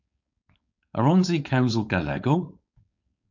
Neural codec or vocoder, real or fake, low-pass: codec, 16 kHz, 4.8 kbps, FACodec; fake; 7.2 kHz